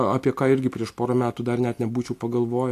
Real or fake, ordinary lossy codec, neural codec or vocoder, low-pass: real; AAC, 64 kbps; none; 14.4 kHz